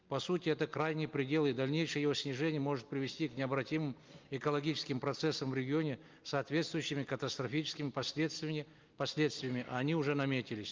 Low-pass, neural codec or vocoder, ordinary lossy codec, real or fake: 7.2 kHz; none; Opus, 32 kbps; real